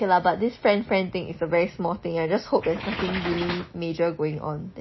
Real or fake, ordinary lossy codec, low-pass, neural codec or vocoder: real; MP3, 24 kbps; 7.2 kHz; none